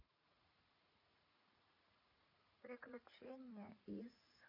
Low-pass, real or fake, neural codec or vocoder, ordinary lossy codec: 5.4 kHz; fake; vocoder, 44.1 kHz, 80 mel bands, Vocos; MP3, 32 kbps